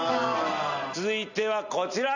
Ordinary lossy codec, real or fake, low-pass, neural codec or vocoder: none; real; 7.2 kHz; none